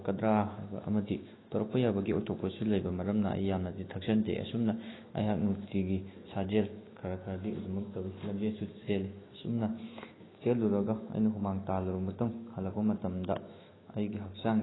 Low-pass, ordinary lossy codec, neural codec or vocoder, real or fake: 7.2 kHz; AAC, 16 kbps; autoencoder, 48 kHz, 128 numbers a frame, DAC-VAE, trained on Japanese speech; fake